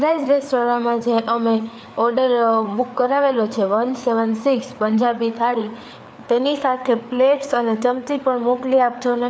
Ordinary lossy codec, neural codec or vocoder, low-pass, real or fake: none; codec, 16 kHz, 4 kbps, FreqCodec, larger model; none; fake